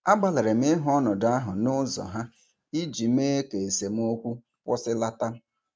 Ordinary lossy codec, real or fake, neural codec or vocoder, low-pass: none; real; none; none